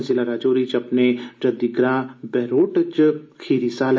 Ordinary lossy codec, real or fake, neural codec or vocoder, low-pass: none; real; none; none